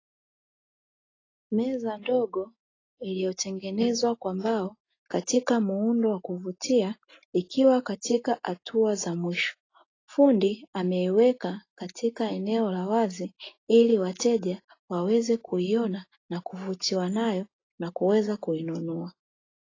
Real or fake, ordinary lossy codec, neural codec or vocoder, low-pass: real; AAC, 32 kbps; none; 7.2 kHz